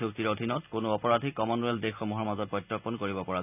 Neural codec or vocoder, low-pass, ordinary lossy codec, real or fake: none; 3.6 kHz; none; real